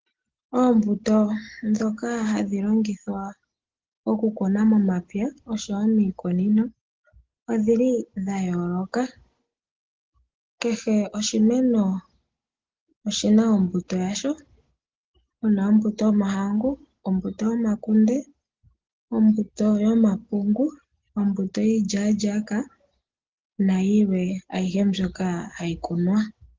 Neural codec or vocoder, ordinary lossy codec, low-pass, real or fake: none; Opus, 16 kbps; 7.2 kHz; real